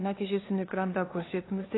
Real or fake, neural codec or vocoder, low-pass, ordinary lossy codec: fake; codec, 16 kHz, 2 kbps, FunCodec, trained on LibriTTS, 25 frames a second; 7.2 kHz; AAC, 16 kbps